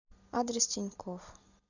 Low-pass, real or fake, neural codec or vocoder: 7.2 kHz; real; none